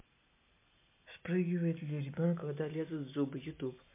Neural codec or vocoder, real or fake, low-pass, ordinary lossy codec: none; real; 3.6 kHz; MP3, 24 kbps